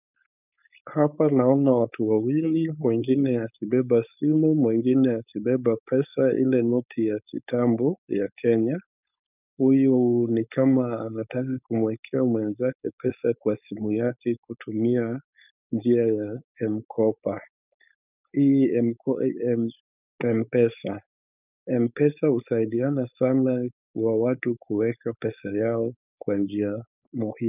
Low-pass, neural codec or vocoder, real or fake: 3.6 kHz; codec, 16 kHz, 4.8 kbps, FACodec; fake